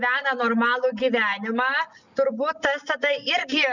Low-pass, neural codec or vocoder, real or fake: 7.2 kHz; none; real